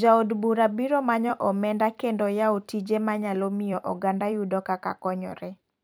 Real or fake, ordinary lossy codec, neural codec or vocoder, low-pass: fake; none; vocoder, 44.1 kHz, 128 mel bands every 256 samples, BigVGAN v2; none